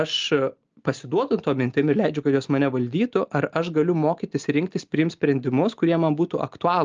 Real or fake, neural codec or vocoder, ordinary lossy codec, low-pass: real; none; Opus, 24 kbps; 7.2 kHz